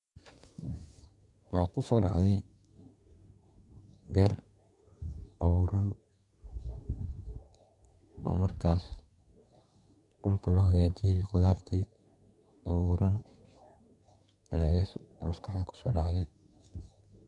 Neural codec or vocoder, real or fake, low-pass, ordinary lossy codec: codec, 24 kHz, 1 kbps, SNAC; fake; 10.8 kHz; none